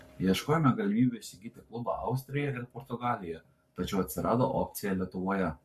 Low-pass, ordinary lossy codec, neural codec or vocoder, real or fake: 14.4 kHz; MP3, 64 kbps; codec, 44.1 kHz, 7.8 kbps, Pupu-Codec; fake